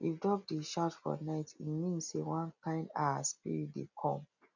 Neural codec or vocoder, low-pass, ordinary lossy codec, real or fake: none; 7.2 kHz; none; real